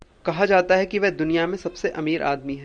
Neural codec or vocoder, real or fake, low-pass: none; real; 9.9 kHz